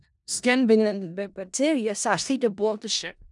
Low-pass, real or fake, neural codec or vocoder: 10.8 kHz; fake; codec, 16 kHz in and 24 kHz out, 0.4 kbps, LongCat-Audio-Codec, four codebook decoder